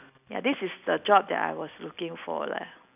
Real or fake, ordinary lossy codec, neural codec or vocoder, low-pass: real; none; none; 3.6 kHz